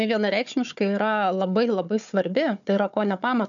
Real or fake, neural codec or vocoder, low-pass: fake; codec, 16 kHz, 4 kbps, FunCodec, trained on Chinese and English, 50 frames a second; 7.2 kHz